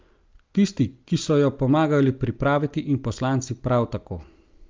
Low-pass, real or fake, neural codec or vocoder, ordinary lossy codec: 7.2 kHz; real; none; Opus, 24 kbps